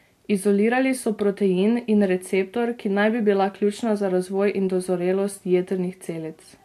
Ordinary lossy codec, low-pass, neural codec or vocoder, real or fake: AAC, 64 kbps; 14.4 kHz; none; real